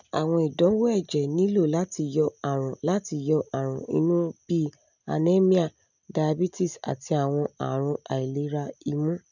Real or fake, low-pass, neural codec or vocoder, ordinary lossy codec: real; 7.2 kHz; none; none